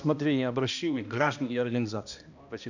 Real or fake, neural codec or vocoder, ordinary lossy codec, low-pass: fake; codec, 16 kHz, 1 kbps, X-Codec, HuBERT features, trained on balanced general audio; none; 7.2 kHz